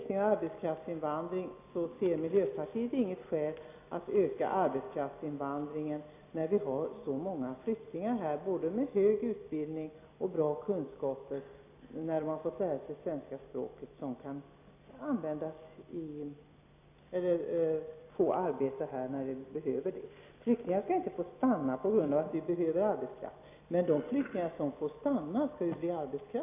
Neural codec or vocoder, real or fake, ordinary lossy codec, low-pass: none; real; AAC, 32 kbps; 3.6 kHz